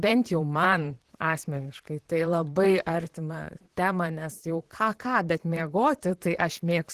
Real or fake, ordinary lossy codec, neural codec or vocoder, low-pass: fake; Opus, 16 kbps; vocoder, 44.1 kHz, 128 mel bands, Pupu-Vocoder; 14.4 kHz